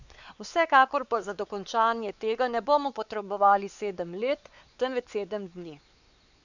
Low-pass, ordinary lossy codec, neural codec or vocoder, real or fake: 7.2 kHz; none; codec, 16 kHz, 4 kbps, X-Codec, WavLM features, trained on Multilingual LibriSpeech; fake